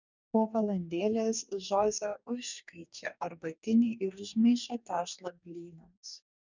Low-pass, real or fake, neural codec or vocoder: 7.2 kHz; fake; codec, 44.1 kHz, 2.6 kbps, DAC